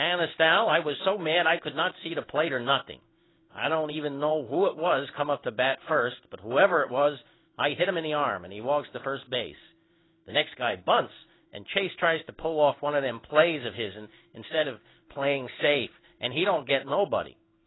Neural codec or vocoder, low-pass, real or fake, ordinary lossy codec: codec, 16 kHz in and 24 kHz out, 1 kbps, XY-Tokenizer; 7.2 kHz; fake; AAC, 16 kbps